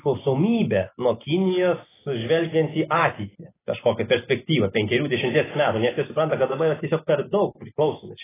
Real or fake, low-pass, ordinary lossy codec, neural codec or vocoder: real; 3.6 kHz; AAC, 16 kbps; none